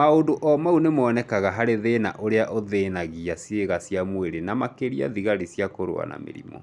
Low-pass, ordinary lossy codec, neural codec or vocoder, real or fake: none; none; none; real